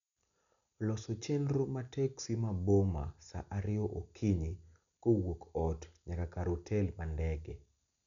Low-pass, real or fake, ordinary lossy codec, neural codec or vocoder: 7.2 kHz; real; none; none